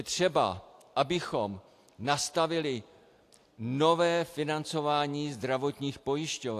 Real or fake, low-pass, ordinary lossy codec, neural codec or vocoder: real; 14.4 kHz; AAC, 64 kbps; none